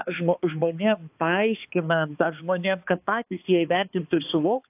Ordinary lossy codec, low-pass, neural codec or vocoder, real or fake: AAC, 32 kbps; 3.6 kHz; codec, 16 kHz, 2 kbps, X-Codec, HuBERT features, trained on balanced general audio; fake